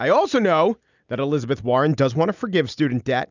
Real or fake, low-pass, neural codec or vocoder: real; 7.2 kHz; none